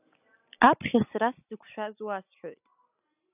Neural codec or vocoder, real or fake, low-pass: none; real; 3.6 kHz